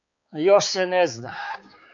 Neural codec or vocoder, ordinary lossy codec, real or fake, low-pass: codec, 16 kHz, 4 kbps, X-Codec, HuBERT features, trained on balanced general audio; AAC, 64 kbps; fake; 7.2 kHz